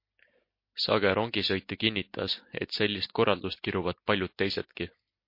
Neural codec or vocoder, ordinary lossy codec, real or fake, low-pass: none; MP3, 32 kbps; real; 5.4 kHz